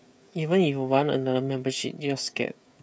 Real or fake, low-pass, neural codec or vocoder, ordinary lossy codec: fake; none; codec, 16 kHz, 16 kbps, FreqCodec, smaller model; none